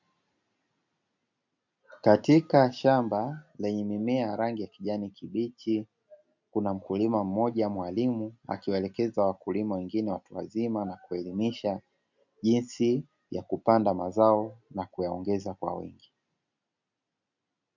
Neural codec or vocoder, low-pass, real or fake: none; 7.2 kHz; real